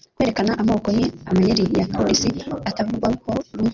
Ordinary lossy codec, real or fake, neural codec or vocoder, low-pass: Opus, 64 kbps; real; none; 7.2 kHz